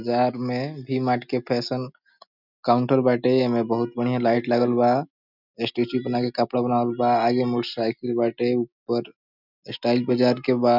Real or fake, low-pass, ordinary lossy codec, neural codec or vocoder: real; 5.4 kHz; none; none